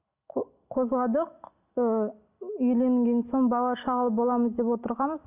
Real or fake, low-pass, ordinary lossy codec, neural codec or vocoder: real; 3.6 kHz; none; none